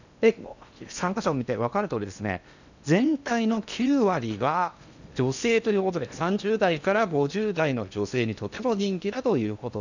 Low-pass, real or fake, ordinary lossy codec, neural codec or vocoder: 7.2 kHz; fake; none; codec, 16 kHz in and 24 kHz out, 0.8 kbps, FocalCodec, streaming, 65536 codes